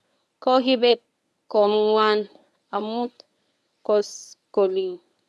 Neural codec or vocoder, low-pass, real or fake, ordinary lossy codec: codec, 24 kHz, 0.9 kbps, WavTokenizer, medium speech release version 1; none; fake; none